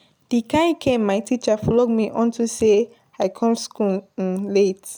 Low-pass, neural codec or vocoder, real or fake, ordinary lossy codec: none; none; real; none